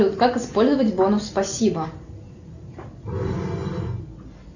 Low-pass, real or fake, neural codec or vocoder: 7.2 kHz; real; none